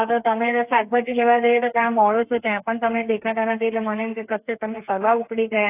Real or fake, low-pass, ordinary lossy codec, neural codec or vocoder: fake; 3.6 kHz; AAC, 24 kbps; codec, 32 kHz, 1.9 kbps, SNAC